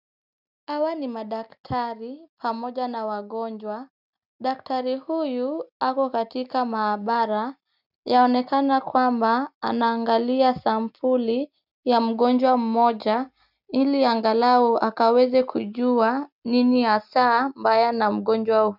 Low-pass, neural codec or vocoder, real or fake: 5.4 kHz; none; real